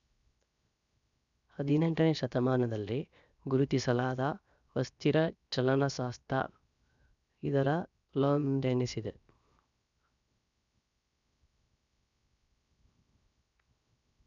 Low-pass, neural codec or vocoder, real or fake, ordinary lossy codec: 7.2 kHz; codec, 16 kHz, 0.7 kbps, FocalCodec; fake; none